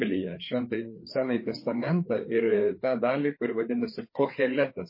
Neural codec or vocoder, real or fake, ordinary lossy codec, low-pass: codec, 16 kHz, 4 kbps, FreqCodec, larger model; fake; MP3, 24 kbps; 5.4 kHz